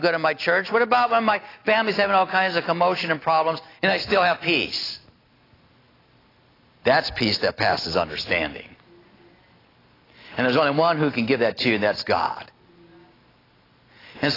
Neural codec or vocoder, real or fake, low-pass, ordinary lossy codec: none; real; 5.4 kHz; AAC, 24 kbps